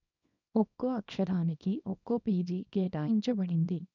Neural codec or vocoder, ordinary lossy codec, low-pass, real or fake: codec, 24 kHz, 0.9 kbps, WavTokenizer, small release; none; 7.2 kHz; fake